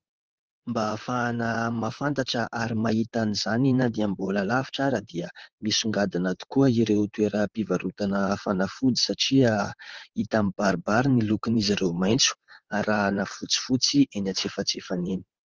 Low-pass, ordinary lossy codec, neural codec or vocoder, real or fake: 7.2 kHz; Opus, 24 kbps; vocoder, 44.1 kHz, 128 mel bands, Pupu-Vocoder; fake